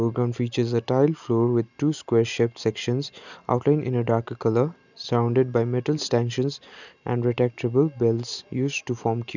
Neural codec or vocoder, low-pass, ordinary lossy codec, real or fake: none; 7.2 kHz; none; real